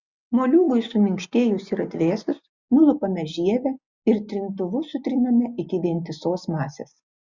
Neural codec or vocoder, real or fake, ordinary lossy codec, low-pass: none; real; Opus, 64 kbps; 7.2 kHz